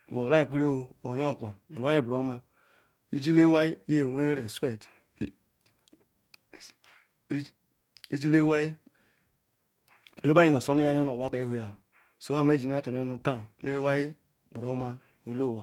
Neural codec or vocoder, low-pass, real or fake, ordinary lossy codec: codec, 44.1 kHz, 2.6 kbps, DAC; 19.8 kHz; fake; MP3, 96 kbps